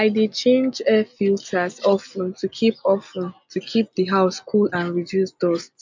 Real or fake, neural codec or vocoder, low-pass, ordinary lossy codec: real; none; 7.2 kHz; MP3, 64 kbps